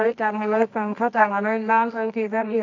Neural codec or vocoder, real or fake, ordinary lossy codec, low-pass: codec, 24 kHz, 0.9 kbps, WavTokenizer, medium music audio release; fake; none; 7.2 kHz